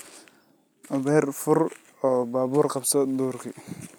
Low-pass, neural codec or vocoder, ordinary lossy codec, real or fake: none; none; none; real